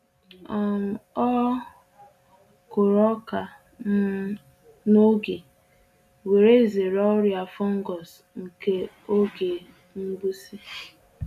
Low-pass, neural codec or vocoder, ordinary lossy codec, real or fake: 14.4 kHz; none; none; real